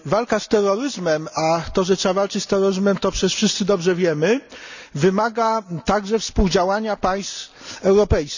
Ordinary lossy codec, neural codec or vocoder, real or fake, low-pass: none; none; real; 7.2 kHz